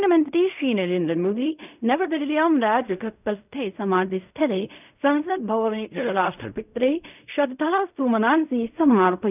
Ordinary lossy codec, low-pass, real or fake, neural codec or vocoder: none; 3.6 kHz; fake; codec, 16 kHz in and 24 kHz out, 0.4 kbps, LongCat-Audio-Codec, fine tuned four codebook decoder